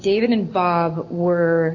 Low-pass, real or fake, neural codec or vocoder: 7.2 kHz; real; none